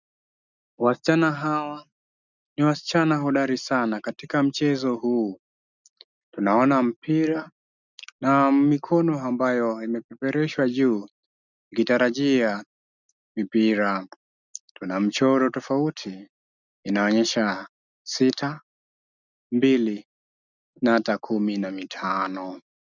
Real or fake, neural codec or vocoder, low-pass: real; none; 7.2 kHz